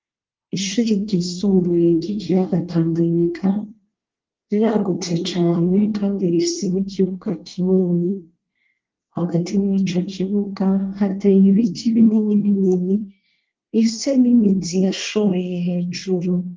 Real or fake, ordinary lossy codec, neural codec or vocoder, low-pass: fake; Opus, 32 kbps; codec, 24 kHz, 1 kbps, SNAC; 7.2 kHz